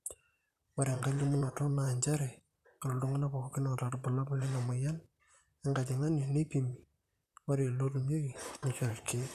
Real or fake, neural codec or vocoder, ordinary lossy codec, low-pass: fake; vocoder, 44.1 kHz, 128 mel bands, Pupu-Vocoder; none; 14.4 kHz